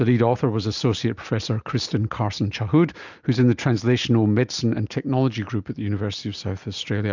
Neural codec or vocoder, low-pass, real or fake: none; 7.2 kHz; real